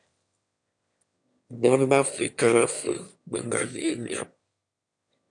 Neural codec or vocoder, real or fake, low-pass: autoencoder, 22.05 kHz, a latent of 192 numbers a frame, VITS, trained on one speaker; fake; 9.9 kHz